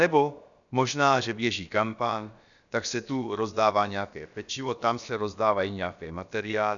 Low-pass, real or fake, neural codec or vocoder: 7.2 kHz; fake; codec, 16 kHz, about 1 kbps, DyCAST, with the encoder's durations